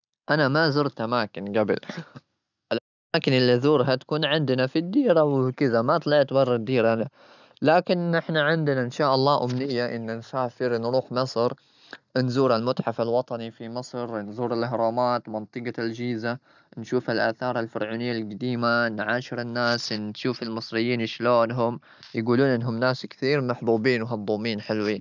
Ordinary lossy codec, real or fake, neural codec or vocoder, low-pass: none; real; none; 7.2 kHz